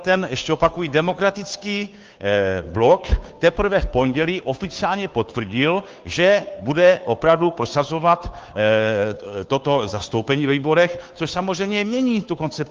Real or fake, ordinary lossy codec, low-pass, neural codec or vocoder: fake; Opus, 32 kbps; 7.2 kHz; codec, 16 kHz, 2 kbps, FunCodec, trained on Chinese and English, 25 frames a second